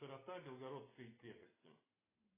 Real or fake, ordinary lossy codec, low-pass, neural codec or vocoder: real; AAC, 16 kbps; 3.6 kHz; none